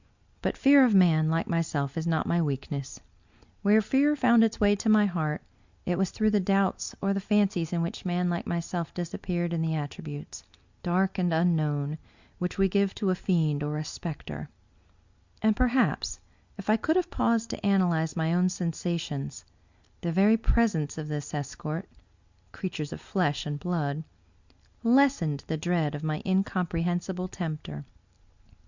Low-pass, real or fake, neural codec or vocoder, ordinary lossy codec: 7.2 kHz; real; none; Opus, 64 kbps